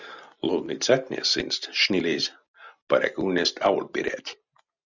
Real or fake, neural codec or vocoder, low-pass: real; none; 7.2 kHz